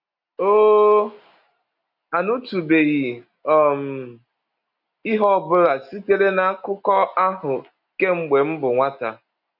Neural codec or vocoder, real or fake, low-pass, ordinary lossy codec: none; real; 5.4 kHz; none